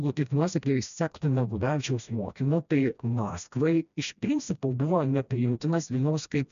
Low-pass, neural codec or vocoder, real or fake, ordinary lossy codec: 7.2 kHz; codec, 16 kHz, 1 kbps, FreqCodec, smaller model; fake; MP3, 96 kbps